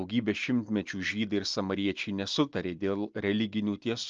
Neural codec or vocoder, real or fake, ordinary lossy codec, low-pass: none; real; Opus, 24 kbps; 7.2 kHz